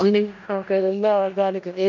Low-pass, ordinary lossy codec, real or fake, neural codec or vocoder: 7.2 kHz; none; fake; codec, 16 kHz in and 24 kHz out, 0.4 kbps, LongCat-Audio-Codec, four codebook decoder